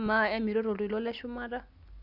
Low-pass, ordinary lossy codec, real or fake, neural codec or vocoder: 5.4 kHz; none; real; none